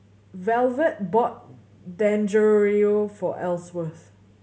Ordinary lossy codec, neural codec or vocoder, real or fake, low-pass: none; none; real; none